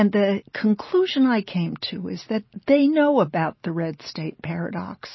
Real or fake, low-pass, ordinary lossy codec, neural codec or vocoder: real; 7.2 kHz; MP3, 24 kbps; none